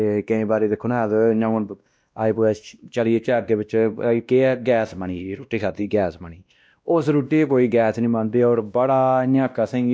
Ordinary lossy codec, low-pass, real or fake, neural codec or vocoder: none; none; fake; codec, 16 kHz, 1 kbps, X-Codec, WavLM features, trained on Multilingual LibriSpeech